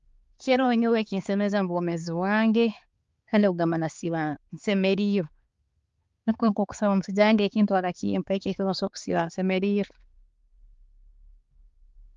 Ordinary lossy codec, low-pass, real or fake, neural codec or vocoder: Opus, 32 kbps; 7.2 kHz; fake; codec, 16 kHz, 4 kbps, X-Codec, HuBERT features, trained on balanced general audio